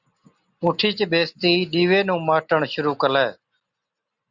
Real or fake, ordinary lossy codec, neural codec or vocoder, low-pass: real; Opus, 64 kbps; none; 7.2 kHz